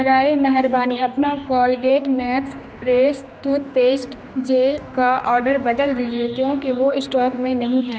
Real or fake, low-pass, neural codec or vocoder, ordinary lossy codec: fake; none; codec, 16 kHz, 2 kbps, X-Codec, HuBERT features, trained on general audio; none